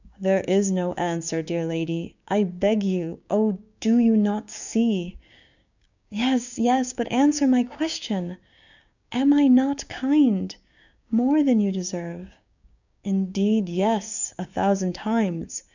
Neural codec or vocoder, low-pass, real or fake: codec, 16 kHz, 6 kbps, DAC; 7.2 kHz; fake